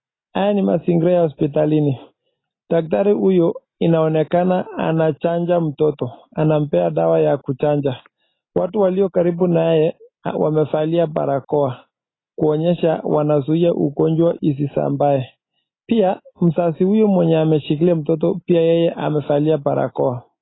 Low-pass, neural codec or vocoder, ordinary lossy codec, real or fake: 7.2 kHz; none; AAC, 16 kbps; real